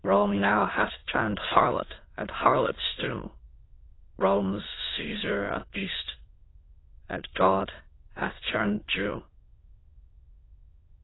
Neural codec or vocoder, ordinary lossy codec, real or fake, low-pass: autoencoder, 22.05 kHz, a latent of 192 numbers a frame, VITS, trained on many speakers; AAC, 16 kbps; fake; 7.2 kHz